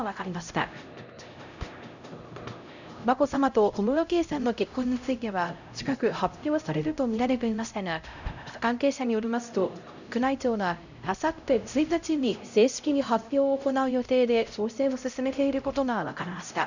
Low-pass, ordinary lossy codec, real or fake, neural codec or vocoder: 7.2 kHz; none; fake; codec, 16 kHz, 0.5 kbps, X-Codec, HuBERT features, trained on LibriSpeech